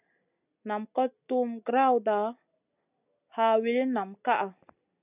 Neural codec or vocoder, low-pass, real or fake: none; 3.6 kHz; real